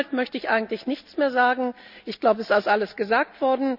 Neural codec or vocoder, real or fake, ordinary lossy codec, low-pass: none; real; none; 5.4 kHz